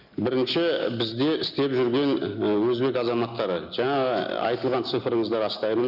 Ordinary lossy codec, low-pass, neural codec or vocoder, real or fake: none; 5.4 kHz; none; real